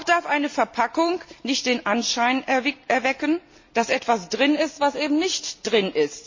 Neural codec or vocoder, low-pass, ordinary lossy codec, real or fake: none; 7.2 kHz; MP3, 32 kbps; real